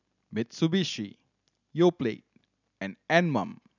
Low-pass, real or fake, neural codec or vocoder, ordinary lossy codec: 7.2 kHz; real; none; none